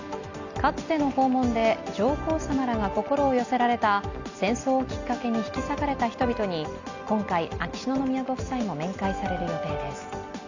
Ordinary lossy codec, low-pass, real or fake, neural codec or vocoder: Opus, 64 kbps; 7.2 kHz; real; none